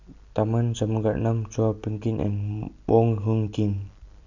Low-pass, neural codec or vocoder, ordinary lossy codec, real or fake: 7.2 kHz; vocoder, 44.1 kHz, 128 mel bands every 256 samples, BigVGAN v2; none; fake